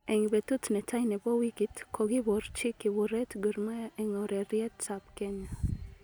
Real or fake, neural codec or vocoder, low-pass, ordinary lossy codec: real; none; none; none